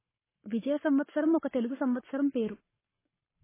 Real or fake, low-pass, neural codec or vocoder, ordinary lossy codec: fake; 3.6 kHz; codec, 44.1 kHz, 7.8 kbps, Pupu-Codec; MP3, 16 kbps